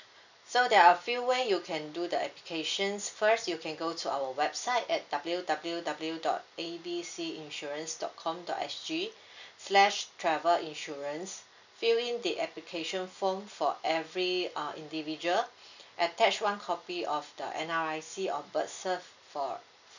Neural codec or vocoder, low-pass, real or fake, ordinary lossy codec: none; 7.2 kHz; real; none